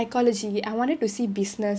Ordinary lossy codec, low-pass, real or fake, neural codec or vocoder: none; none; real; none